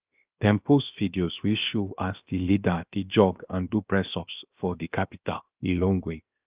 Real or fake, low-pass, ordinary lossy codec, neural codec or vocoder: fake; 3.6 kHz; Opus, 24 kbps; codec, 16 kHz, 0.7 kbps, FocalCodec